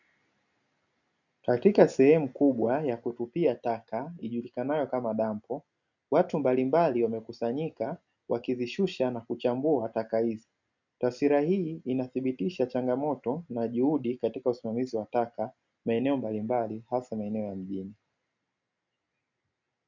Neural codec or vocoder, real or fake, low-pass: none; real; 7.2 kHz